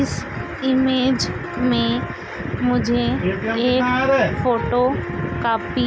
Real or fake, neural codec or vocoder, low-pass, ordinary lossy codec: real; none; none; none